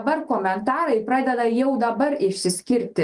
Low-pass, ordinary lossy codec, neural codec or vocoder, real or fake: 10.8 kHz; Opus, 32 kbps; none; real